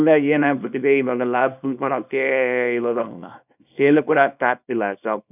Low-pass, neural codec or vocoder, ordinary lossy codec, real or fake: 3.6 kHz; codec, 24 kHz, 0.9 kbps, WavTokenizer, small release; none; fake